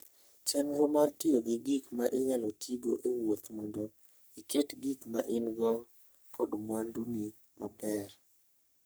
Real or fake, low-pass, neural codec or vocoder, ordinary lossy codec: fake; none; codec, 44.1 kHz, 3.4 kbps, Pupu-Codec; none